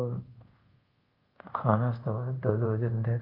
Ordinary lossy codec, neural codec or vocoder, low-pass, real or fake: Opus, 32 kbps; codec, 24 kHz, 0.5 kbps, DualCodec; 5.4 kHz; fake